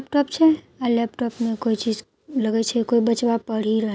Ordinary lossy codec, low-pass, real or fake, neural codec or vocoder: none; none; real; none